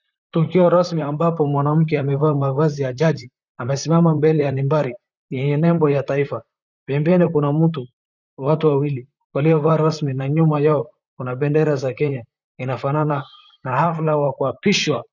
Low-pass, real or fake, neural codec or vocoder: 7.2 kHz; fake; vocoder, 44.1 kHz, 128 mel bands, Pupu-Vocoder